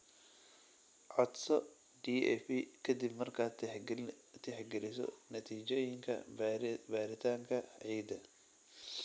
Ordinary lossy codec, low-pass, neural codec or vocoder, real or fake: none; none; none; real